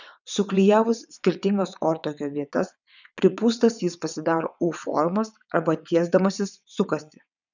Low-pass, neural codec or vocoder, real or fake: 7.2 kHz; vocoder, 22.05 kHz, 80 mel bands, WaveNeXt; fake